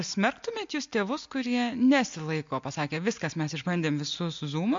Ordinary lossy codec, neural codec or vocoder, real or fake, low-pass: MP3, 48 kbps; none; real; 7.2 kHz